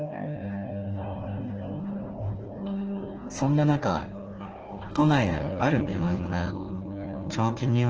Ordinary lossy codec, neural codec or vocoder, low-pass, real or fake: Opus, 16 kbps; codec, 16 kHz, 1 kbps, FunCodec, trained on Chinese and English, 50 frames a second; 7.2 kHz; fake